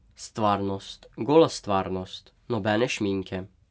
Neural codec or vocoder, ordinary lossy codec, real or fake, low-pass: none; none; real; none